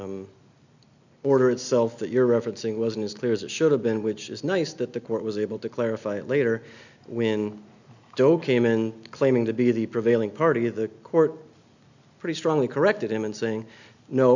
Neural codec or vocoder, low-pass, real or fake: none; 7.2 kHz; real